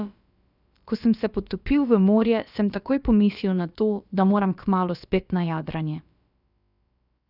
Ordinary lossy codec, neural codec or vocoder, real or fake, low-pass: none; codec, 16 kHz, about 1 kbps, DyCAST, with the encoder's durations; fake; 5.4 kHz